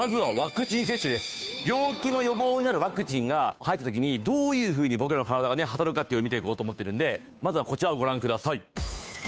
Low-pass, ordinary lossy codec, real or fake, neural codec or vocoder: none; none; fake; codec, 16 kHz, 2 kbps, FunCodec, trained on Chinese and English, 25 frames a second